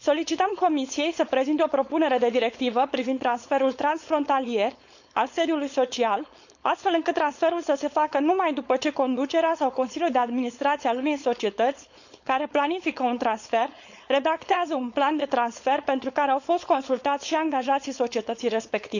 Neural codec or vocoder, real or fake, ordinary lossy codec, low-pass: codec, 16 kHz, 4.8 kbps, FACodec; fake; none; 7.2 kHz